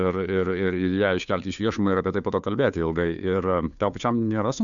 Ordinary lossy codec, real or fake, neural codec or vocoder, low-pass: MP3, 96 kbps; fake; codec, 16 kHz, 2 kbps, FunCodec, trained on Chinese and English, 25 frames a second; 7.2 kHz